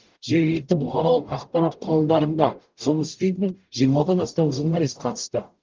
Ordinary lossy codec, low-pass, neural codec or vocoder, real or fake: Opus, 24 kbps; 7.2 kHz; codec, 44.1 kHz, 0.9 kbps, DAC; fake